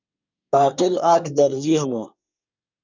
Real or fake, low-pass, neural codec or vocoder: fake; 7.2 kHz; codec, 24 kHz, 1 kbps, SNAC